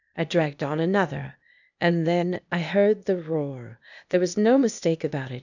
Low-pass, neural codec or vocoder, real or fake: 7.2 kHz; codec, 16 kHz, 0.8 kbps, ZipCodec; fake